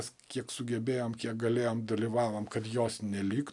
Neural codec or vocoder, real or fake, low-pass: none; real; 10.8 kHz